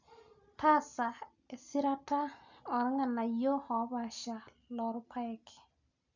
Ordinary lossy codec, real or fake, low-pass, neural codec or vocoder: none; real; 7.2 kHz; none